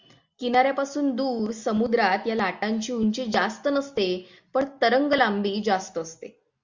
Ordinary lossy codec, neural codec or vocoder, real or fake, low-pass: Opus, 64 kbps; none; real; 7.2 kHz